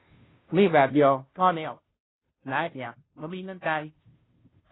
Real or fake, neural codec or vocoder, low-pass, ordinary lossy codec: fake; codec, 16 kHz, 0.5 kbps, FunCodec, trained on Chinese and English, 25 frames a second; 7.2 kHz; AAC, 16 kbps